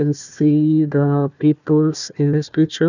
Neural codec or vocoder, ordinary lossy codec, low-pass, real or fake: codec, 16 kHz, 1 kbps, FunCodec, trained on Chinese and English, 50 frames a second; none; 7.2 kHz; fake